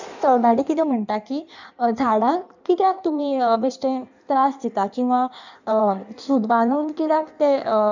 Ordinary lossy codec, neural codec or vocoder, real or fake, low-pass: none; codec, 16 kHz in and 24 kHz out, 1.1 kbps, FireRedTTS-2 codec; fake; 7.2 kHz